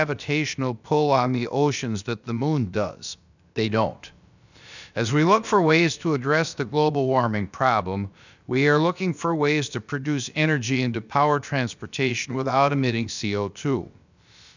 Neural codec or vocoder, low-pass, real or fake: codec, 16 kHz, about 1 kbps, DyCAST, with the encoder's durations; 7.2 kHz; fake